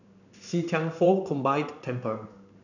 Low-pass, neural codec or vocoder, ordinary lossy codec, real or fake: 7.2 kHz; codec, 16 kHz in and 24 kHz out, 1 kbps, XY-Tokenizer; none; fake